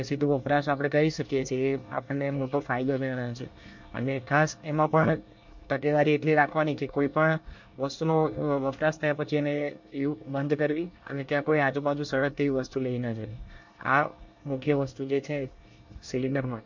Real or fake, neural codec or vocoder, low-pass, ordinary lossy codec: fake; codec, 24 kHz, 1 kbps, SNAC; 7.2 kHz; MP3, 48 kbps